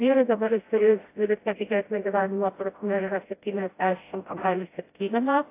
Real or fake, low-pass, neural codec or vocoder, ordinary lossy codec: fake; 3.6 kHz; codec, 16 kHz, 0.5 kbps, FreqCodec, smaller model; AAC, 24 kbps